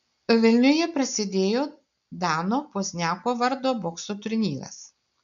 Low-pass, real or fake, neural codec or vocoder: 7.2 kHz; real; none